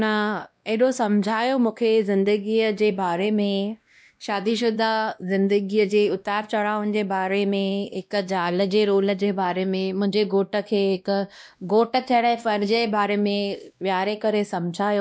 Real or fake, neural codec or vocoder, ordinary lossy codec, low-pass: fake; codec, 16 kHz, 1 kbps, X-Codec, WavLM features, trained on Multilingual LibriSpeech; none; none